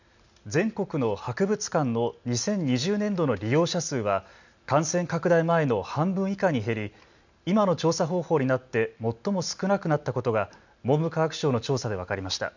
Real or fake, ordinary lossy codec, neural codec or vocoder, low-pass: real; none; none; 7.2 kHz